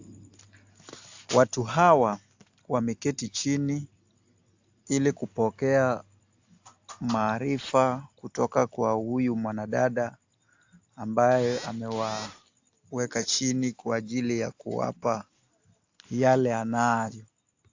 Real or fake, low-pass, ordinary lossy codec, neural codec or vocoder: real; 7.2 kHz; AAC, 48 kbps; none